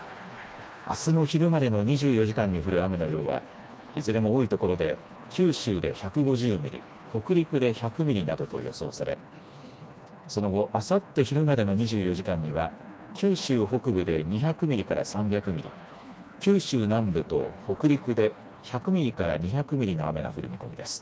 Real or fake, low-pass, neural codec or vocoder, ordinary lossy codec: fake; none; codec, 16 kHz, 2 kbps, FreqCodec, smaller model; none